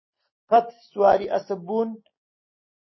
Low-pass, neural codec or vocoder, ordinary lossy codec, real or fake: 7.2 kHz; none; MP3, 24 kbps; real